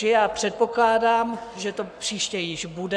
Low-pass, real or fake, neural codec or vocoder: 9.9 kHz; fake; codec, 44.1 kHz, 7.8 kbps, Pupu-Codec